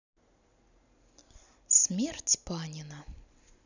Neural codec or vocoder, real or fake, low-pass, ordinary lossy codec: none; real; 7.2 kHz; none